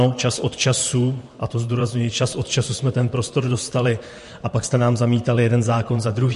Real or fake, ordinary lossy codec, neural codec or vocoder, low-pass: fake; MP3, 48 kbps; vocoder, 44.1 kHz, 128 mel bands, Pupu-Vocoder; 14.4 kHz